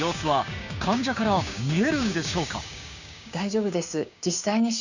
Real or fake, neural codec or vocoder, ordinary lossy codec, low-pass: fake; codec, 16 kHz, 6 kbps, DAC; none; 7.2 kHz